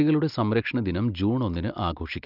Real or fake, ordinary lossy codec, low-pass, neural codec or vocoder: real; Opus, 24 kbps; 5.4 kHz; none